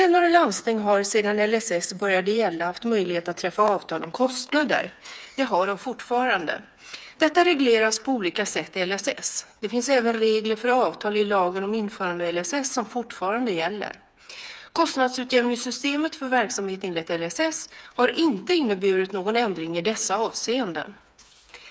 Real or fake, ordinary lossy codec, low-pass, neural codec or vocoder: fake; none; none; codec, 16 kHz, 4 kbps, FreqCodec, smaller model